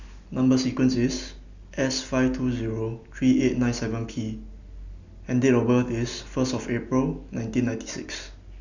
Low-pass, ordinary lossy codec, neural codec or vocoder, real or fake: 7.2 kHz; none; none; real